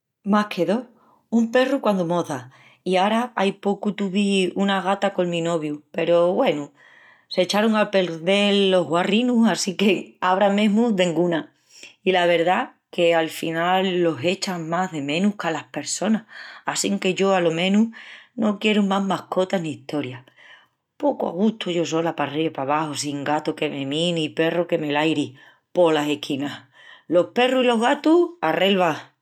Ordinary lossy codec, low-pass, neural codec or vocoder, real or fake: none; 19.8 kHz; none; real